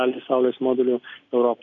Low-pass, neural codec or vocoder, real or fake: 7.2 kHz; none; real